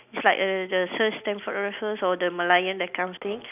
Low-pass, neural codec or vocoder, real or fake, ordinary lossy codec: 3.6 kHz; none; real; none